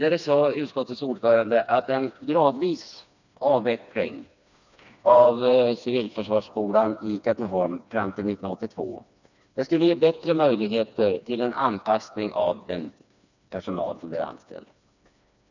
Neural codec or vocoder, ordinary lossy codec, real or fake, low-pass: codec, 16 kHz, 2 kbps, FreqCodec, smaller model; none; fake; 7.2 kHz